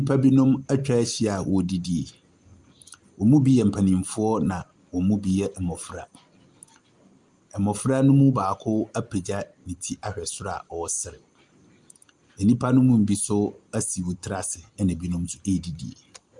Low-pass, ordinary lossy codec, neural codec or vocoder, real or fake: 10.8 kHz; Opus, 32 kbps; none; real